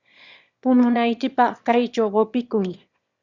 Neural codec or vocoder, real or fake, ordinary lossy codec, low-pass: autoencoder, 22.05 kHz, a latent of 192 numbers a frame, VITS, trained on one speaker; fake; Opus, 64 kbps; 7.2 kHz